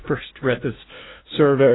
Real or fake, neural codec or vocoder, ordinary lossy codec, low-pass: fake; codec, 16 kHz, 0.5 kbps, FunCodec, trained on LibriTTS, 25 frames a second; AAC, 16 kbps; 7.2 kHz